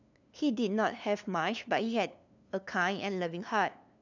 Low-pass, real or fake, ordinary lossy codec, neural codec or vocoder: 7.2 kHz; fake; none; codec, 16 kHz, 2 kbps, FunCodec, trained on LibriTTS, 25 frames a second